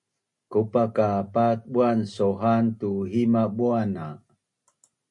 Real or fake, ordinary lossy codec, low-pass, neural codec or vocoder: real; MP3, 48 kbps; 10.8 kHz; none